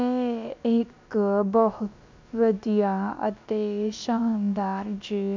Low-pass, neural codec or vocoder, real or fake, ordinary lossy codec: 7.2 kHz; codec, 16 kHz, about 1 kbps, DyCAST, with the encoder's durations; fake; MP3, 64 kbps